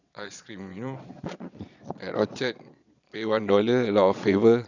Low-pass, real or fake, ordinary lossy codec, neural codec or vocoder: 7.2 kHz; fake; none; vocoder, 22.05 kHz, 80 mel bands, Vocos